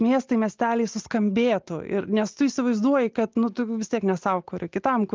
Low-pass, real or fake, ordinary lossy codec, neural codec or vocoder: 7.2 kHz; real; Opus, 24 kbps; none